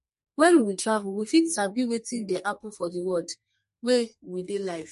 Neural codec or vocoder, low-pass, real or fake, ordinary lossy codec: codec, 32 kHz, 1.9 kbps, SNAC; 14.4 kHz; fake; MP3, 48 kbps